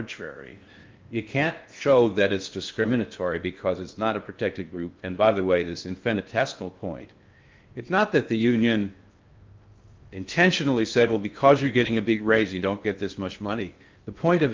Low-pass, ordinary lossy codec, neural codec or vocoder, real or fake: 7.2 kHz; Opus, 32 kbps; codec, 16 kHz in and 24 kHz out, 0.6 kbps, FocalCodec, streaming, 4096 codes; fake